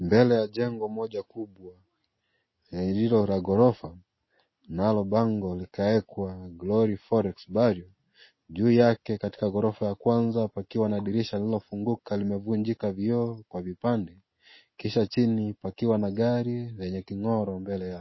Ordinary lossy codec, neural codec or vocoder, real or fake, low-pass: MP3, 24 kbps; none; real; 7.2 kHz